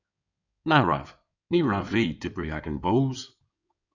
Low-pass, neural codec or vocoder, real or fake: 7.2 kHz; codec, 16 kHz in and 24 kHz out, 2.2 kbps, FireRedTTS-2 codec; fake